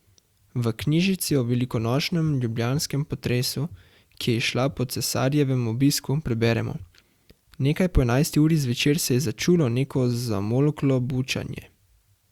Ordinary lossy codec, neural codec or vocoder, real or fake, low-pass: Opus, 64 kbps; none; real; 19.8 kHz